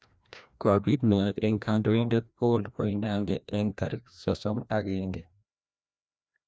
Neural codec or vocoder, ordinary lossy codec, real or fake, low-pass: codec, 16 kHz, 1 kbps, FreqCodec, larger model; none; fake; none